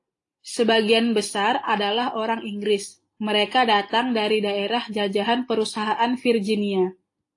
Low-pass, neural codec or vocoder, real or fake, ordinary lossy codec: 10.8 kHz; none; real; AAC, 48 kbps